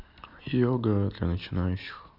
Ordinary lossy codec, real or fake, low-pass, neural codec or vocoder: Opus, 64 kbps; real; 5.4 kHz; none